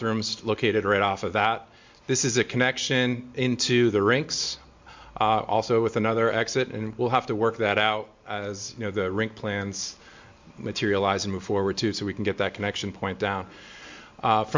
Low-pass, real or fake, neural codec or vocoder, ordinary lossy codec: 7.2 kHz; real; none; MP3, 64 kbps